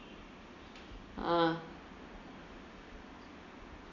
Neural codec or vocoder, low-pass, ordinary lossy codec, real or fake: none; 7.2 kHz; none; real